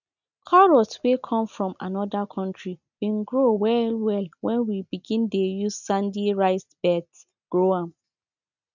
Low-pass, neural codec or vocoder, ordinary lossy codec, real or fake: 7.2 kHz; none; none; real